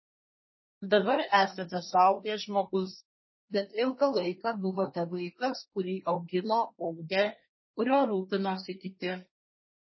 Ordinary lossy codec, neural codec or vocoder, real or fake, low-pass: MP3, 24 kbps; codec, 24 kHz, 1 kbps, SNAC; fake; 7.2 kHz